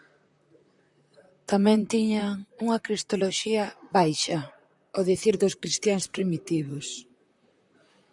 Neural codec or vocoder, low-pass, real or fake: vocoder, 44.1 kHz, 128 mel bands, Pupu-Vocoder; 10.8 kHz; fake